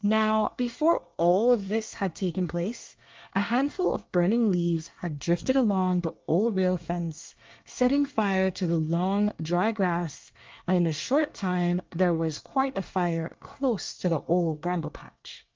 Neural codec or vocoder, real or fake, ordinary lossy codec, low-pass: codec, 24 kHz, 1 kbps, SNAC; fake; Opus, 32 kbps; 7.2 kHz